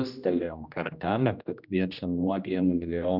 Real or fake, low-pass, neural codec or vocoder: fake; 5.4 kHz; codec, 16 kHz, 1 kbps, X-Codec, HuBERT features, trained on general audio